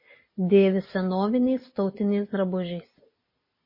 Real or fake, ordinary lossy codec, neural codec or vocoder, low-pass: real; MP3, 24 kbps; none; 5.4 kHz